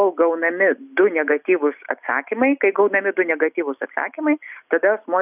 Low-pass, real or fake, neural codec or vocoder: 3.6 kHz; real; none